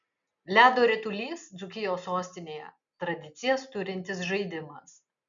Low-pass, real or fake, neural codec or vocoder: 7.2 kHz; real; none